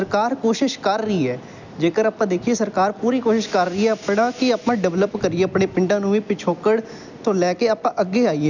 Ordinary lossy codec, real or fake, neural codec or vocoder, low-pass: none; real; none; 7.2 kHz